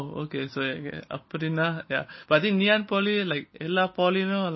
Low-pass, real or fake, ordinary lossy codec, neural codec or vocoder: 7.2 kHz; real; MP3, 24 kbps; none